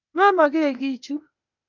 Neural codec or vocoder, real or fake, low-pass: codec, 16 kHz, 0.8 kbps, ZipCodec; fake; 7.2 kHz